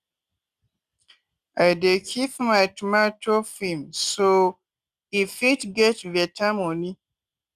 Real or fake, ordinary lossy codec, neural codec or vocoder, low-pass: real; Opus, 64 kbps; none; 14.4 kHz